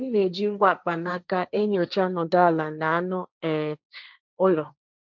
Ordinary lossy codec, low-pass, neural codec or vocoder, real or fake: none; 7.2 kHz; codec, 16 kHz, 1.1 kbps, Voila-Tokenizer; fake